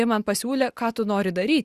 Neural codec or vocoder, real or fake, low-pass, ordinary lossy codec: none; real; 14.4 kHz; Opus, 64 kbps